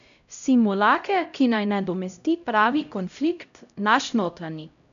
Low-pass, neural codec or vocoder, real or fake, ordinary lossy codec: 7.2 kHz; codec, 16 kHz, 0.5 kbps, X-Codec, HuBERT features, trained on LibriSpeech; fake; none